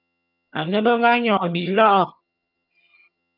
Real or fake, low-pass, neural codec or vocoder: fake; 5.4 kHz; vocoder, 22.05 kHz, 80 mel bands, HiFi-GAN